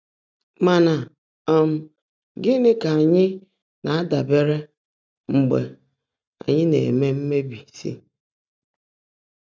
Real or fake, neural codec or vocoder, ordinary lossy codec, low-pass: real; none; none; none